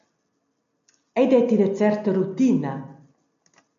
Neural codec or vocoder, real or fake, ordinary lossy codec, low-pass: none; real; MP3, 64 kbps; 7.2 kHz